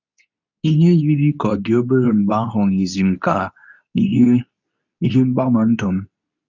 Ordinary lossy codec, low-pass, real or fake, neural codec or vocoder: none; 7.2 kHz; fake; codec, 24 kHz, 0.9 kbps, WavTokenizer, medium speech release version 2